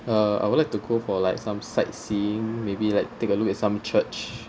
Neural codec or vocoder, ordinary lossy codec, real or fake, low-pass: none; none; real; none